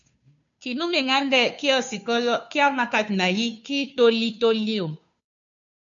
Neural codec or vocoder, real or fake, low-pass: codec, 16 kHz, 2 kbps, FunCodec, trained on Chinese and English, 25 frames a second; fake; 7.2 kHz